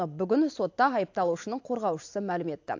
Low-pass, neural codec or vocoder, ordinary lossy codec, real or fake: 7.2 kHz; none; AAC, 48 kbps; real